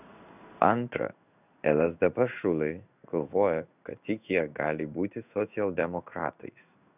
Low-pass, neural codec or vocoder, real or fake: 3.6 kHz; codec, 16 kHz in and 24 kHz out, 1 kbps, XY-Tokenizer; fake